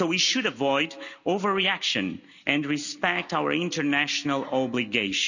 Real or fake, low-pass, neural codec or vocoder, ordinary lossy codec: real; 7.2 kHz; none; none